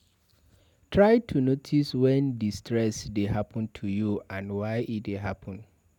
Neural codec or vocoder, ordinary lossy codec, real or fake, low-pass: none; none; real; 19.8 kHz